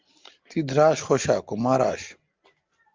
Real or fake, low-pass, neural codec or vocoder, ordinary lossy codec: real; 7.2 kHz; none; Opus, 32 kbps